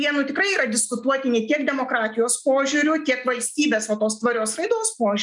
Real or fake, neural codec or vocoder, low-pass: real; none; 10.8 kHz